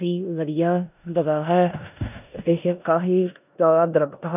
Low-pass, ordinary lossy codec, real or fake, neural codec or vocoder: 3.6 kHz; none; fake; codec, 16 kHz in and 24 kHz out, 0.9 kbps, LongCat-Audio-Codec, four codebook decoder